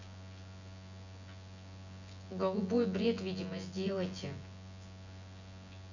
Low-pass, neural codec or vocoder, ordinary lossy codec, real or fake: 7.2 kHz; vocoder, 24 kHz, 100 mel bands, Vocos; none; fake